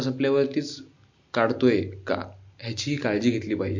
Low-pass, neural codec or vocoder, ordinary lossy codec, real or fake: 7.2 kHz; none; MP3, 48 kbps; real